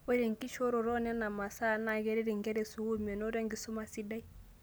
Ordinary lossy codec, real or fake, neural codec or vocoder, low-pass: none; real; none; none